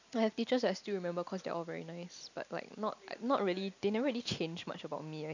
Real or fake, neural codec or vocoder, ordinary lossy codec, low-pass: real; none; none; 7.2 kHz